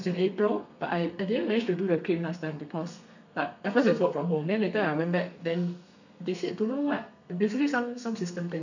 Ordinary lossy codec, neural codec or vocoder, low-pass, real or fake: none; codec, 32 kHz, 1.9 kbps, SNAC; 7.2 kHz; fake